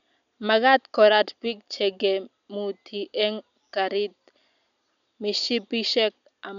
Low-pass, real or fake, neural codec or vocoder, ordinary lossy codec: 7.2 kHz; real; none; none